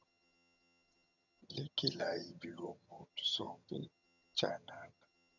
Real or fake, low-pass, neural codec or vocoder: fake; 7.2 kHz; vocoder, 22.05 kHz, 80 mel bands, HiFi-GAN